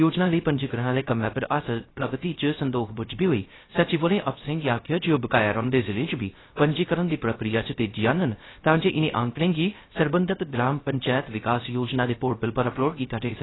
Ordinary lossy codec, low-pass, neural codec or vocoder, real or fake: AAC, 16 kbps; 7.2 kHz; codec, 16 kHz, 0.3 kbps, FocalCodec; fake